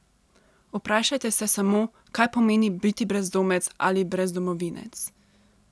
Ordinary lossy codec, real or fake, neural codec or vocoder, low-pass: none; real; none; none